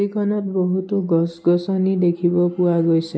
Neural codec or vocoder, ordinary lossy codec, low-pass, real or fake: none; none; none; real